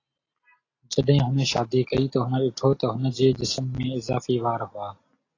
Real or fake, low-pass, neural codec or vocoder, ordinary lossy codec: real; 7.2 kHz; none; AAC, 32 kbps